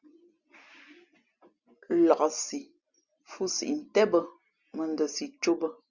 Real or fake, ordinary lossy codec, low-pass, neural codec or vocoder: real; Opus, 64 kbps; 7.2 kHz; none